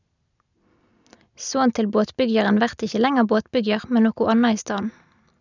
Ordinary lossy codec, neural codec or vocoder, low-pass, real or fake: none; none; 7.2 kHz; real